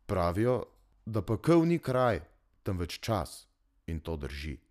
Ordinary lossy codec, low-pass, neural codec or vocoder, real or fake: none; 14.4 kHz; none; real